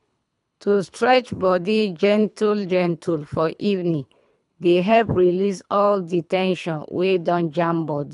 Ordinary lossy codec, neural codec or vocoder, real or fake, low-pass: none; codec, 24 kHz, 3 kbps, HILCodec; fake; 10.8 kHz